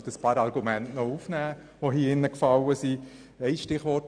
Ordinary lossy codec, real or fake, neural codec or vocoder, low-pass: none; real; none; 9.9 kHz